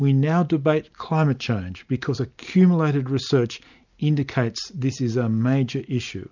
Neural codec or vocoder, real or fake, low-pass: none; real; 7.2 kHz